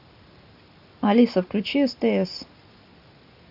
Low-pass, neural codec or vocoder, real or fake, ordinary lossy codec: 5.4 kHz; none; real; AAC, 48 kbps